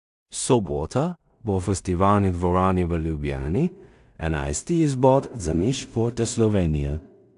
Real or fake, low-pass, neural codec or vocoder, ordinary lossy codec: fake; 10.8 kHz; codec, 16 kHz in and 24 kHz out, 0.4 kbps, LongCat-Audio-Codec, two codebook decoder; AAC, 96 kbps